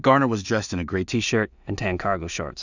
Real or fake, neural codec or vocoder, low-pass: fake; codec, 16 kHz in and 24 kHz out, 0.4 kbps, LongCat-Audio-Codec, two codebook decoder; 7.2 kHz